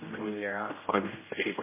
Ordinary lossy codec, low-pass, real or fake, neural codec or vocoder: MP3, 16 kbps; 3.6 kHz; fake; codec, 16 kHz, 0.5 kbps, X-Codec, HuBERT features, trained on general audio